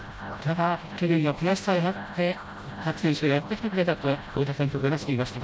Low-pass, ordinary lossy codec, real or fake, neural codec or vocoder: none; none; fake; codec, 16 kHz, 0.5 kbps, FreqCodec, smaller model